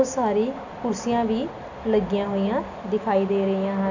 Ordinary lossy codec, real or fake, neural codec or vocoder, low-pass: none; real; none; 7.2 kHz